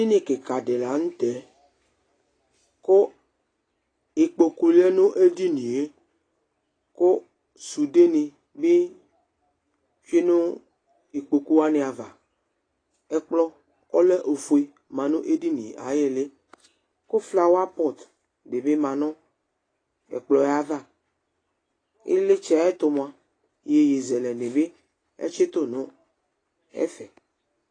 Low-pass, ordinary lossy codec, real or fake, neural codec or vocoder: 9.9 kHz; AAC, 32 kbps; real; none